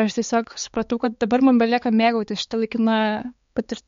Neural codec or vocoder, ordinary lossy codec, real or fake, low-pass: codec, 16 kHz, 4 kbps, X-Codec, HuBERT features, trained on balanced general audio; MP3, 48 kbps; fake; 7.2 kHz